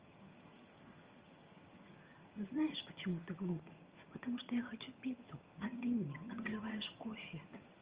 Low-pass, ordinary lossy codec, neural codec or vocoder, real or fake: 3.6 kHz; Opus, 24 kbps; vocoder, 22.05 kHz, 80 mel bands, HiFi-GAN; fake